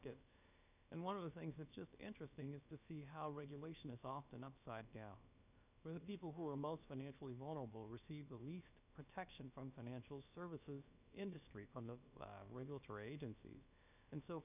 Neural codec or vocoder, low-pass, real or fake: codec, 16 kHz, about 1 kbps, DyCAST, with the encoder's durations; 3.6 kHz; fake